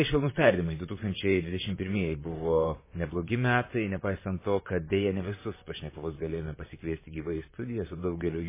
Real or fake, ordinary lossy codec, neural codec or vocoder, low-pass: fake; MP3, 16 kbps; vocoder, 44.1 kHz, 128 mel bands, Pupu-Vocoder; 3.6 kHz